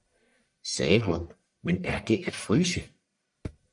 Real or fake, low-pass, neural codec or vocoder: fake; 10.8 kHz; codec, 44.1 kHz, 1.7 kbps, Pupu-Codec